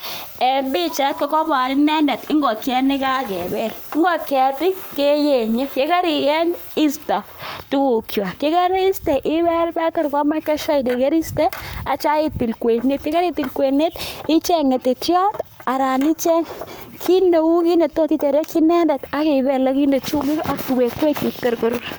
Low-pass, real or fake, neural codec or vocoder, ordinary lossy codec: none; fake; codec, 44.1 kHz, 7.8 kbps, Pupu-Codec; none